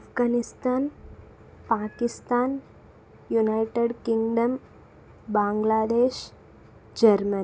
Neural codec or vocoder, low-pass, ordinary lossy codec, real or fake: none; none; none; real